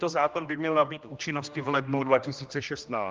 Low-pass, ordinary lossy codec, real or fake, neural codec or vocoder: 7.2 kHz; Opus, 24 kbps; fake; codec, 16 kHz, 1 kbps, X-Codec, HuBERT features, trained on general audio